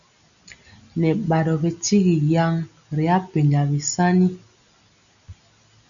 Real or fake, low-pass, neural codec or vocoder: real; 7.2 kHz; none